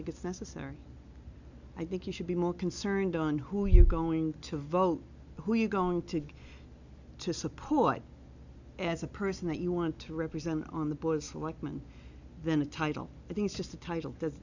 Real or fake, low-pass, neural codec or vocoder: real; 7.2 kHz; none